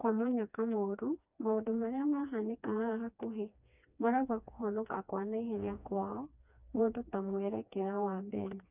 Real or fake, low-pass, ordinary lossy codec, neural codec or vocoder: fake; 3.6 kHz; none; codec, 16 kHz, 2 kbps, FreqCodec, smaller model